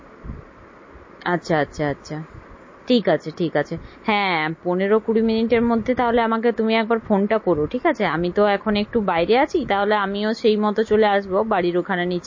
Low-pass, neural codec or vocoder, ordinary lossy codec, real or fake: 7.2 kHz; none; MP3, 32 kbps; real